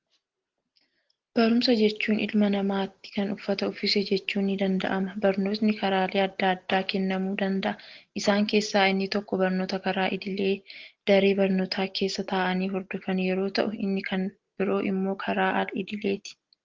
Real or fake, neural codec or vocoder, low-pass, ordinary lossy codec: real; none; 7.2 kHz; Opus, 16 kbps